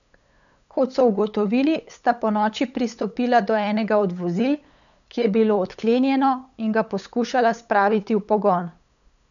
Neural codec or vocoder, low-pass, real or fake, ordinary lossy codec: codec, 16 kHz, 8 kbps, FunCodec, trained on LibriTTS, 25 frames a second; 7.2 kHz; fake; none